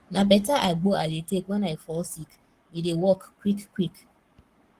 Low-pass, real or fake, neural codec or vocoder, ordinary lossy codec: 14.4 kHz; fake; codec, 44.1 kHz, 7.8 kbps, Pupu-Codec; Opus, 24 kbps